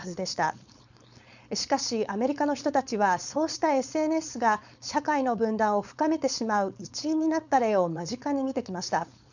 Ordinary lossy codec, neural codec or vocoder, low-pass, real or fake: none; codec, 16 kHz, 4.8 kbps, FACodec; 7.2 kHz; fake